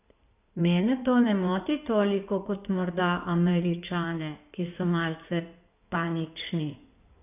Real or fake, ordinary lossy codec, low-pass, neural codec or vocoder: fake; none; 3.6 kHz; codec, 16 kHz in and 24 kHz out, 2.2 kbps, FireRedTTS-2 codec